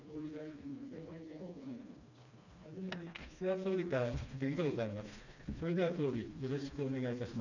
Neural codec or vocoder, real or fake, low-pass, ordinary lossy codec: codec, 16 kHz, 2 kbps, FreqCodec, smaller model; fake; 7.2 kHz; none